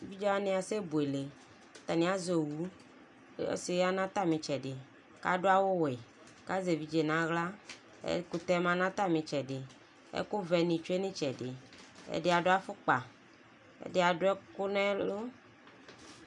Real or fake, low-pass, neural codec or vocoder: real; 10.8 kHz; none